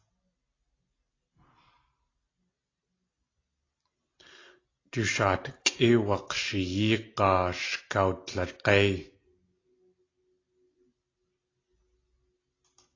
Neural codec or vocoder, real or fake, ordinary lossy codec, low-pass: none; real; AAC, 32 kbps; 7.2 kHz